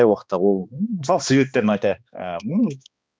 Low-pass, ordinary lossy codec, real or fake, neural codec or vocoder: none; none; fake; codec, 16 kHz, 2 kbps, X-Codec, HuBERT features, trained on balanced general audio